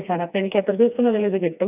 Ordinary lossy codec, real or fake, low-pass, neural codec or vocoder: none; fake; 3.6 kHz; codec, 16 kHz, 2 kbps, FreqCodec, smaller model